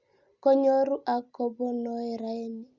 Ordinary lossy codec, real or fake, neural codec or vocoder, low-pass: Opus, 64 kbps; real; none; 7.2 kHz